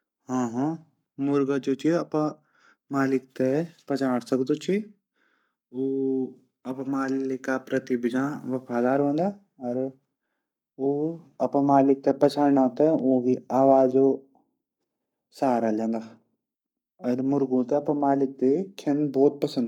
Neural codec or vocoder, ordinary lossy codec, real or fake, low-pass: codec, 44.1 kHz, 7.8 kbps, Pupu-Codec; none; fake; 19.8 kHz